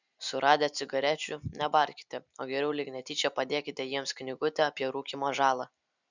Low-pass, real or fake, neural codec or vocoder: 7.2 kHz; real; none